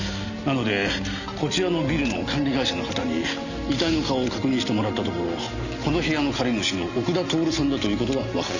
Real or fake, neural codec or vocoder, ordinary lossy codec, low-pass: real; none; none; 7.2 kHz